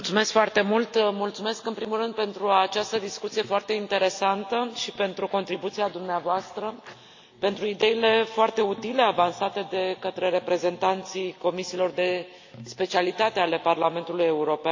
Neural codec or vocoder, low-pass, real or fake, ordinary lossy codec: none; 7.2 kHz; real; AAC, 48 kbps